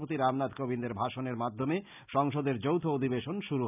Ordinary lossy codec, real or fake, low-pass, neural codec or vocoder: none; real; 3.6 kHz; none